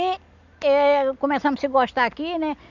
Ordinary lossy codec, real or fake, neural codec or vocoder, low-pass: none; real; none; 7.2 kHz